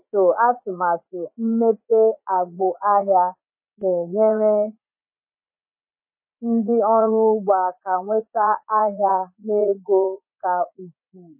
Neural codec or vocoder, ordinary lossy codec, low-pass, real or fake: vocoder, 44.1 kHz, 80 mel bands, Vocos; MP3, 24 kbps; 3.6 kHz; fake